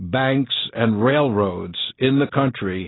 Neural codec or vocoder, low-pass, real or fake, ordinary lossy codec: none; 7.2 kHz; real; AAC, 16 kbps